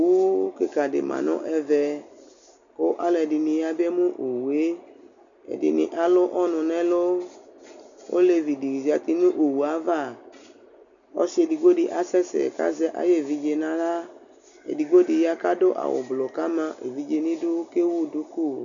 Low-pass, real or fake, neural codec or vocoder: 7.2 kHz; real; none